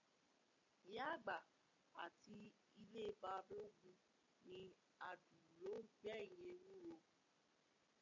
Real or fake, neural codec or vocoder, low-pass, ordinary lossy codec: real; none; 7.2 kHz; MP3, 48 kbps